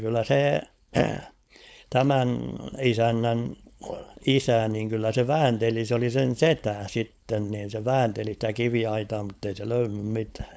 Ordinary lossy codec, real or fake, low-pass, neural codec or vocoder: none; fake; none; codec, 16 kHz, 4.8 kbps, FACodec